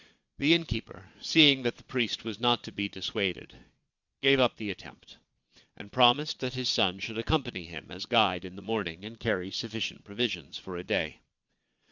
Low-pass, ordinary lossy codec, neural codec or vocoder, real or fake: 7.2 kHz; Opus, 64 kbps; vocoder, 22.05 kHz, 80 mel bands, Vocos; fake